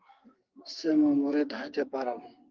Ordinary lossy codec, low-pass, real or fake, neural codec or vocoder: Opus, 32 kbps; 7.2 kHz; fake; codec, 44.1 kHz, 2.6 kbps, SNAC